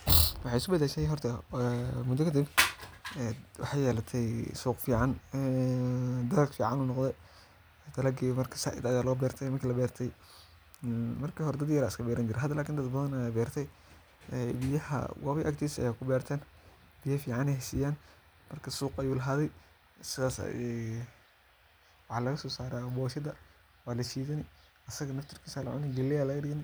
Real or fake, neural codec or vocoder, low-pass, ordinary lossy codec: real; none; none; none